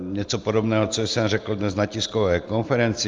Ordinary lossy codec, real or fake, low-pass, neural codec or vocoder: Opus, 32 kbps; real; 7.2 kHz; none